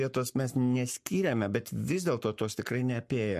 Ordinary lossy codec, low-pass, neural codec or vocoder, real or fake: MP3, 64 kbps; 14.4 kHz; codec, 44.1 kHz, 7.8 kbps, Pupu-Codec; fake